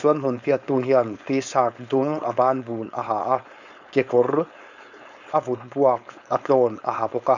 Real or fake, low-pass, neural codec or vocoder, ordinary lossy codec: fake; 7.2 kHz; codec, 16 kHz, 4.8 kbps, FACodec; none